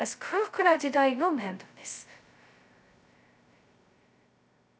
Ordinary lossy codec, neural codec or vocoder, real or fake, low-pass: none; codec, 16 kHz, 0.2 kbps, FocalCodec; fake; none